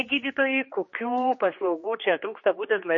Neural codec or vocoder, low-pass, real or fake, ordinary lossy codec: codec, 16 kHz, 2 kbps, X-Codec, HuBERT features, trained on general audio; 7.2 kHz; fake; MP3, 32 kbps